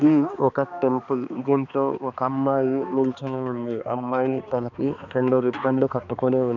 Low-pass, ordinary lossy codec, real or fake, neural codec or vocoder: 7.2 kHz; none; fake; codec, 16 kHz, 2 kbps, X-Codec, HuBERT features, trained on balanced general audio